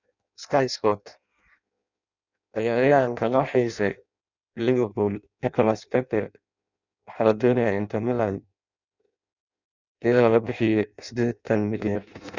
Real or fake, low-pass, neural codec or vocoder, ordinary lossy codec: fake; 7.2 kHz; codec, 16 kHz in and 24 kHz out, 0.6 kbps, FireRedTTS-2 codec; none